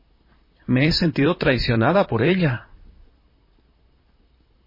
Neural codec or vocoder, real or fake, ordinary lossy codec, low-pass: vocoder, 44.1 kHz, 128 mel bands, Pupu-Vocoder; fake; MP3, 24 kbps; 5.4 kHz